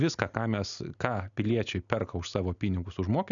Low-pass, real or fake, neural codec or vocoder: 7.2 kHz; real; none